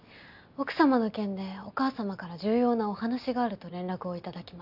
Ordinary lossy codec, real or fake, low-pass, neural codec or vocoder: none; real; 5.4 kHz; none